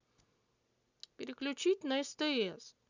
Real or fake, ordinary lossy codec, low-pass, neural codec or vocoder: real; none; 7.2 kHz; none